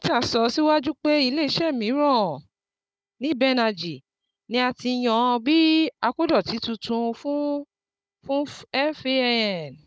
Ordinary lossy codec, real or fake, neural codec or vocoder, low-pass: none; fake; codec, 16 kHz, 16 kbps, FunCodec, trained on Chinese and English, 50 frames a second; none